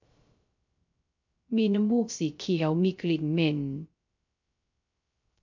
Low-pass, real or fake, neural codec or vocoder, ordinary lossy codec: 7.2 kHz; fake; codec, 16 kHz, 0.3 kbps, FocalCodec; MP3, 48 kbps